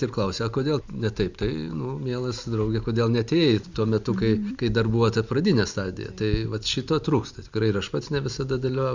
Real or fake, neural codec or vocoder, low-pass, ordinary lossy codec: real; none; 7.2 kHz; Opus, 64 kbps